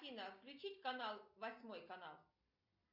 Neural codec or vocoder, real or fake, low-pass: none; real; 5.4 kHz